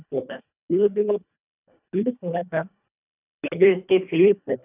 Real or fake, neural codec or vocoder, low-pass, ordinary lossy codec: fake; codec, 24 kHz, 1.5 kbps, HILCodec; 3.6 kHz; none